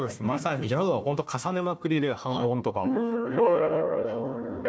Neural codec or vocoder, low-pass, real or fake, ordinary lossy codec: codec, 16 kHz, 1 kbps, FunCodec, trained on Chinese and English, 50 frames a second; none; fake; none